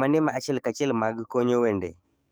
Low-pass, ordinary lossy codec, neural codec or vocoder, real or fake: none; none; codec, 44.1 kHz, 7.8 kbps, DAC; fake